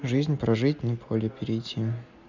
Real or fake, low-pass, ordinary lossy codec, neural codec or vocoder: real; 7.2 kHz; none; none